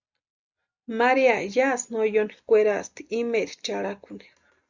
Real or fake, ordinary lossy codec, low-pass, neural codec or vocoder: real; Opus, 64 kbps; 7.2 kHz; none